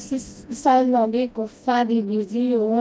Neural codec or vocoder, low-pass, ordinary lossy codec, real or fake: codec, 16 kHz, 1 kbps, FreqCodec, smaller model; none; none; fake